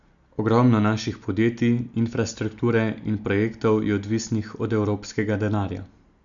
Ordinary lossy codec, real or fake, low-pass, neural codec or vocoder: none; real; 7.2 kHz; none